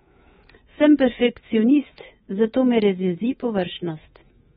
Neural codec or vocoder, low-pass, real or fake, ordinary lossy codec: codec, 24 kHz, 3.1 kbps, DualCodec; 10.8 kHz; fake; AAC, 16 kbps